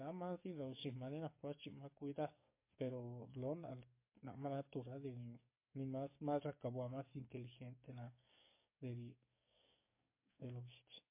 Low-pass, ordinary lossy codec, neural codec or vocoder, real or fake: 3.6 kHz; MP3, 24 kbps; codec, 44.1 kHz, 7.8 kbps, DAC; fake